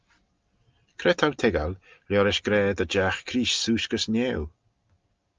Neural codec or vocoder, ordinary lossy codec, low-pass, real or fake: none; Opus, 24 kbps; 7.2 kHz; real